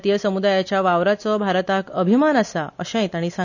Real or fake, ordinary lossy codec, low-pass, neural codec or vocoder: real; none; 7.2 kHz; none